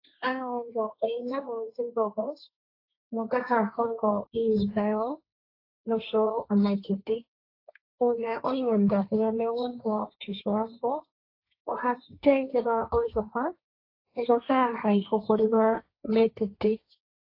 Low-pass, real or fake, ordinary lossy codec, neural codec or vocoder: 5.4 kHz; fake; AAC, 32 kbps; codec, 16 kHz, 1.1 kbps, Voila-Tokenizer